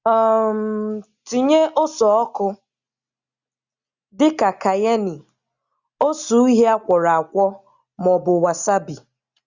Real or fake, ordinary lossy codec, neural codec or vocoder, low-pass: real; Opus, 64 kbps; none; 7.2 kHz